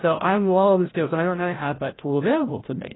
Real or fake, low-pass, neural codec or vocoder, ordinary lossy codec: fake; 7.2 kHz; codec, 16 kHz, 0.5 kbps, FreqCodec, larger model; AAC, 16 kbps